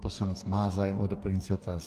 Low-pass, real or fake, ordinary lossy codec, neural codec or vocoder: 14.4 kHz; fake; Opus, 32 kbps; codec, 44.1 kHz, 2.6 kbps, DAC